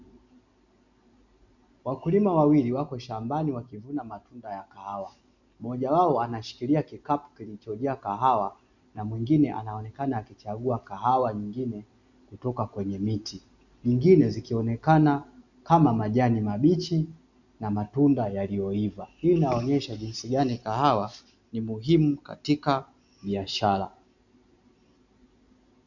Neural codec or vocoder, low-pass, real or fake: none; 7.2 kHz; real